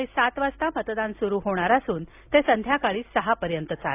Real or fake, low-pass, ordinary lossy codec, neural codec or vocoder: real; 3.6 kHz; AAC, 32 kbps; none